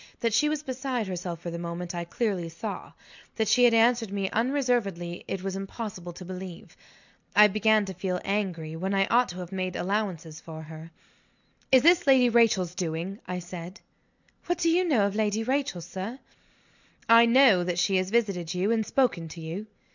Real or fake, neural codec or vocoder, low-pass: real; none; 7.2 kHz